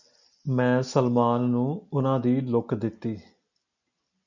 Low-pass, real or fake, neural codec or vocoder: 7.2 kHz; real; none